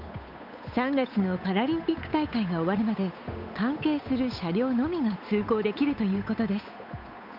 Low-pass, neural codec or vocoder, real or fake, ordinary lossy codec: 5.4 kHz; codec, 16 kHz, 8 kbps, FunCodec, trained on Chinese and English, 25 frames a second; fake; none